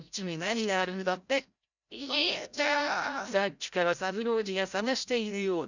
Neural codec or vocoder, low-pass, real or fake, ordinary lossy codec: codec, 16 kHz, 0.5 kbps, FreqCodec, larger model; 7.2 kHz; fake; none